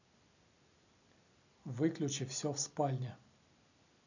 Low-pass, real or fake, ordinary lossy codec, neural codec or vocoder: 7.2 kHz; real; none; none